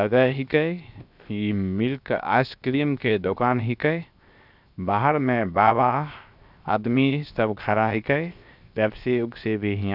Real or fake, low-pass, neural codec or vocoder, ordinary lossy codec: fake; 5.4 kHz; codec, 16 kHz, 0.7 kbps, FocalCodec; none